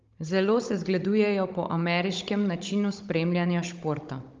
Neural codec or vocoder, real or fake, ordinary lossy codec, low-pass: codec, 16 kHz, 16 kbps, FunCodec, trained on Chinese and English, 50 frames a second; fake; Opus, 32 kbps; 7.2 kHz